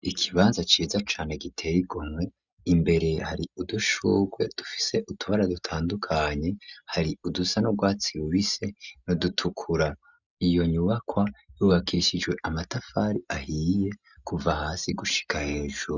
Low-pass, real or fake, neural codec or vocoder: 7.2 kHz; real; none